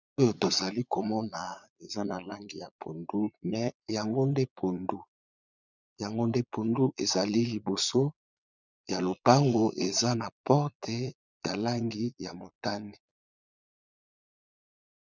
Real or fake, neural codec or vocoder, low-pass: fake; vocoder, 22.05 kHz, 80 mel bands, Vocos; 7.2 kHz